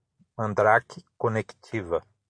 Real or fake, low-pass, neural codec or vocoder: real; 9.9 kHz; none